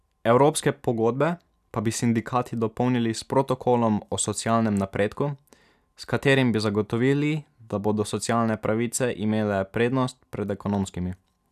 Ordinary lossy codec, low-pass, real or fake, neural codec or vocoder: none; 14.4 kHz; real; none